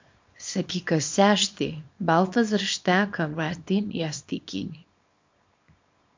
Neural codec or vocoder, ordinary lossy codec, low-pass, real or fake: codec, 24 kHz, 0.9 kbps, WavTokenizer, small release; MP3, 48 kbps; 7.2 kHz; fake